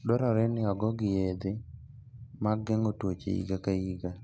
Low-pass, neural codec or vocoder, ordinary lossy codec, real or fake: none; none; none; real